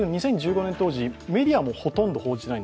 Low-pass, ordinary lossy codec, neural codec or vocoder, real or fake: none; none; none; real